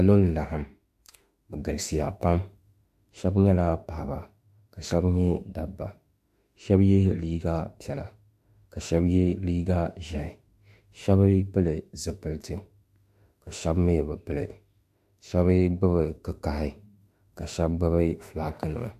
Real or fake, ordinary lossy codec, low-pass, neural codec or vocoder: fake; AAC, 96 kbps; 14.4 kHz; autoencoder, 48 kHz, 32 numbers a frame, DAC-VAE, trained on Japanese speech